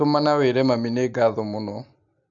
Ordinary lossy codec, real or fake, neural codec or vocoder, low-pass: AAC, 64 kbps; real; none; 7.2 kHz